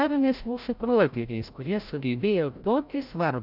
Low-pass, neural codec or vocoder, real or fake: 5.4 kHz; codec, 16 kHz, 0.5 kbps, FreqCodec, larger model; fake